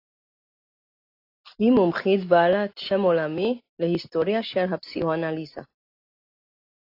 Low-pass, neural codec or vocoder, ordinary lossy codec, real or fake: 5.4 kHz; none; AAC, 32 kbps; real